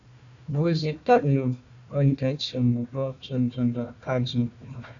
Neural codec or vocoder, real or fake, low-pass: codec, 16 kHz, 1 kbps, FunCodec, trained on Chinese and English, 50 frames a second; fake; 7.2 kHz